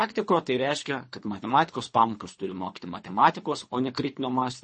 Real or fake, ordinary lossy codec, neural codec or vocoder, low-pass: fake; MP3, 32 kbps; codec, 24 kHz, 3 kbps, HILCodec; 10.8 kHz